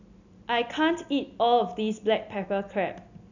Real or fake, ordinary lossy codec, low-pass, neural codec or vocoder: real; none; 7.2 kHz; none